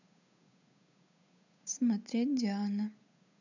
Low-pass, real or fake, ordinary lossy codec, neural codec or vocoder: 7.2 kHz; fake; none; codec, 16 kHz, 8 kbps, FunCodec, trained on Chinese and English, 25 frames a second